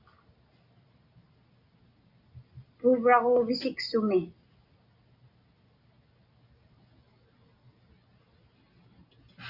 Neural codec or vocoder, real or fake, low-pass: none; real; 5.4 kHz